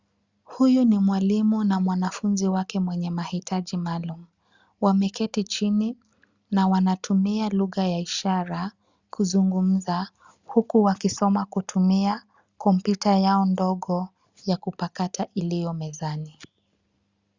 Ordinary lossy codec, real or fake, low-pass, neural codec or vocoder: Opus, 64 kbps; real; 7.2 kHz; none